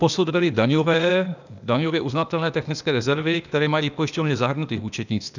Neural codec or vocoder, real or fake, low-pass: codec, 16 kHz, 0.8 kbps, ZipCodec; fake; 7.2 kHz